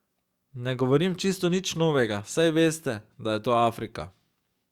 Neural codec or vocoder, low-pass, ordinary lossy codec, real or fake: codec, 44.1 kHz, 7.8 kbps, DAC; 19.8 kHz; Opus, 64 kbps; fake